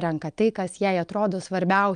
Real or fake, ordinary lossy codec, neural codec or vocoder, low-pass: real; Opus, 32 kbps; none; 9.9 kHz